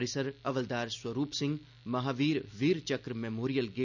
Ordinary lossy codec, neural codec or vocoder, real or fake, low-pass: none; none; real; 7.2 kHz